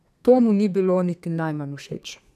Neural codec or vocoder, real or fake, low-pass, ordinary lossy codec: codec, 32 kHz, 1.9 kbps, SNAC; fake; 14.4 kHz; none